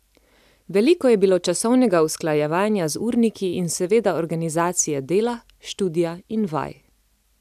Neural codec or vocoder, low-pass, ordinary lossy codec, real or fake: none; 14.4 kHz; none; real